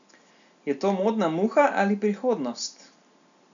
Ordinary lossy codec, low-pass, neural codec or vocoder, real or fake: none; 7.2 kHz; none; real